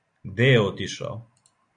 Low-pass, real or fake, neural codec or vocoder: 9.9 kHz; real; none